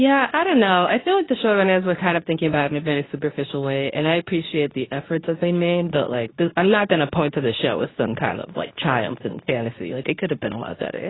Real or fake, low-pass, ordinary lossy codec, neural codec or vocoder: fake; 7.2 kHz; AAC, 16 kbps; codec, 24 kHz, 0.9 kbps, WavTokenizer, medium speech release version 2